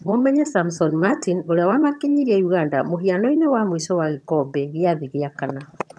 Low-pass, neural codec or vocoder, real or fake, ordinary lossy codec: none; vocoder, 22.05 kHz, 80 mel bands, HiFi-GAN; fake; none